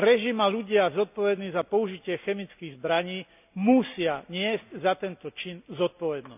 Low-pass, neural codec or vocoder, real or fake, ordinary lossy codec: 3.6 kHz; none; real; none